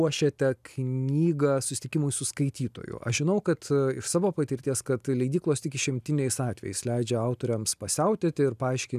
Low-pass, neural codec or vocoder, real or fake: 14.4 kHz; none; real